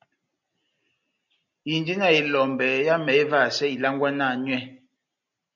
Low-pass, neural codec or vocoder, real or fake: 7.2 kHz; none; real